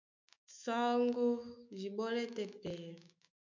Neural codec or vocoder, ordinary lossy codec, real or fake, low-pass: autoencoder, 48 kHz, 128 numbers a frame, DAC-VAE, trained on Japanese speech; MP3, 64 kbps; fake; 7.2 kHz